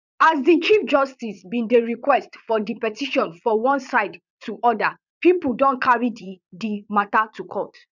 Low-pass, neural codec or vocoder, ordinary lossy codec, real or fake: 7.2 kHz; vocoder, 22.05 kHz, 80 mel bands, Vocos; none; fake